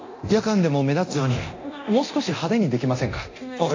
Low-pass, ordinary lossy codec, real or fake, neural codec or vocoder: 7.2 kHz; AAC, 48 kbps; fake; codec, 24 kHz, 0.9 kbps, DualCodec